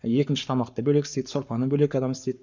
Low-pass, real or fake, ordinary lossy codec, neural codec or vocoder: 7.2 kHz; fake; none; codec, 44.1 kHz, 7.8 kbps, Pupu-Codec